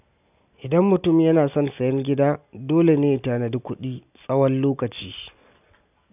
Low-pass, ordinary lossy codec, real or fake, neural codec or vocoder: 3.6 kHz; none; real; none